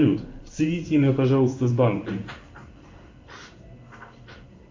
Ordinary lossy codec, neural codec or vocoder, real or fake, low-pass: MP3, 64 kbps; codec, 16 kHz in and 24 kHz out, 1 kbps, XY-Tokenizer; fake; 7.2 kHz